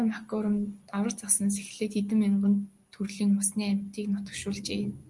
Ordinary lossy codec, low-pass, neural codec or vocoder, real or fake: Opus, 32 kbps; 10.8 kHz; none; real